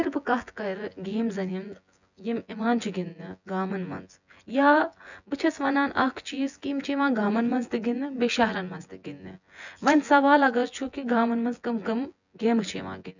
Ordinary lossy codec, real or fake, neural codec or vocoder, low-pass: none; fake; vocoder, 24 kHz, 100 mel bands, Vocos; 7.2 kHz